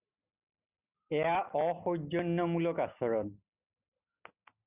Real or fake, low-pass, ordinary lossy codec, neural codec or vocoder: real; 3.6 kHz; Opus, 64 kbps; none